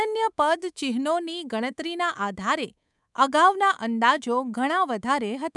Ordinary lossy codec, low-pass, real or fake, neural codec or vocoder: none; 10.8 kHz; fake; codec, 24 kHz, 3.1 kbps, DualCodec